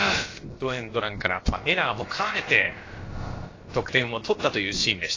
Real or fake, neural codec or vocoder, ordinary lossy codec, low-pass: fake; codec, 16 kHz, about 1 kbps, DyCAST, with the encoder's durations; AAC, 32 kbps; 7.2 kHz